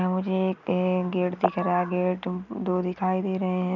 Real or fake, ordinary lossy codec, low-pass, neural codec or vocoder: real; none; 7.2 kHz; none